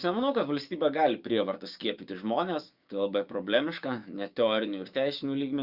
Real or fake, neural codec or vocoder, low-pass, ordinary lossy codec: fake; codec, 16 kHz, 6 kbps, DAC; 5.4 kHz; Opus, 64 kbps